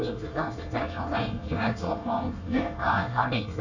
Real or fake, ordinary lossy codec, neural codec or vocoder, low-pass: fake; none; codec, 24 kHz, 1 kbps, SNAC; 7.2 kHz